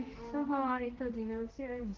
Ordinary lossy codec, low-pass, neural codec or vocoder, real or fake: Opus, 16 kbps; 7.2 kHz; codec, 16 kHz, 1 kbps, X-Codec, HuBERT features, trained on general audio; fake